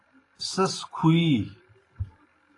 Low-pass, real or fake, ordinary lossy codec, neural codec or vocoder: 10.8 kHz; fake; AAC, 32 kbps; vocoder, 44.1 kHz, 128 mel bands every 512 samples, BigVGAN v2